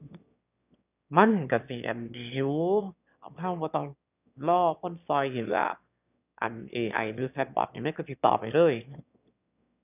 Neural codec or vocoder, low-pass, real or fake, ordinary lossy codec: autoencoder, 22.05 kHz, a latent of 192 numbers a frame, VITS, trained on one speaker; 3.6 kHz; fake; none